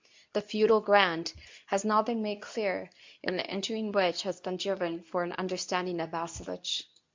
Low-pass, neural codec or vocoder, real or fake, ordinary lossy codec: 7.2 kHz; codec, 24 kHz, 0.9 kbps, WavTokenizer, medium speech release version 2; fake; MP3, 64 kbps